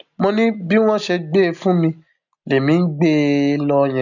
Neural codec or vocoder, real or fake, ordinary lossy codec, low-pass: none; real; none; 7.2 kHz